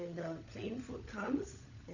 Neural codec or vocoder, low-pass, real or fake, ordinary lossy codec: codec, 16 kHz, 16 kbps, FunCodec, trained on LibriTTS, 50 frames a second; 7.2 kHz; fake; none